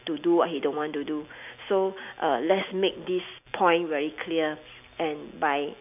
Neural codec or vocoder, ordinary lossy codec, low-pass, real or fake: none; none; 3.6 kHz; real